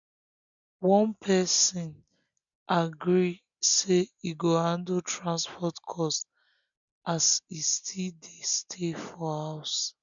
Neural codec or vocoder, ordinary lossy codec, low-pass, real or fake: none; none; 7.2 kHz; real